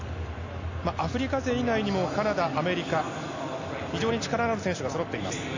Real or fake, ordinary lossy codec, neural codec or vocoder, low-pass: real; AAC, 48 kbps; none; 7.2 kHz